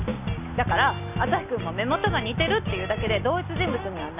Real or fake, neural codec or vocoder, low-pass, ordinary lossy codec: real; none; 3.6 kHz; none